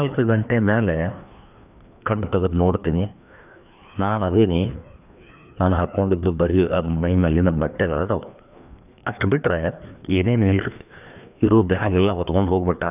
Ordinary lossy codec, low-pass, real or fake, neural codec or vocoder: none; 3.6 kHz; fake; codec, 16 kHz, 2 kbps, FreqCodec, larger model